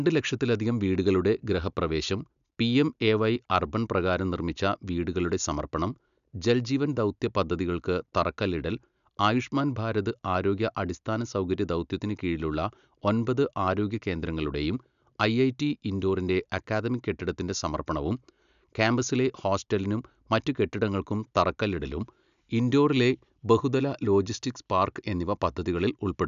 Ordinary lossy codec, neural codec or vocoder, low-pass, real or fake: none; none; 7.2 kHz; real